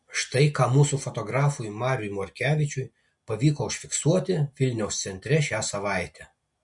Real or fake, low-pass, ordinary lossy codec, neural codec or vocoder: real; 10.8 kHz; MP3, 48 kbps; none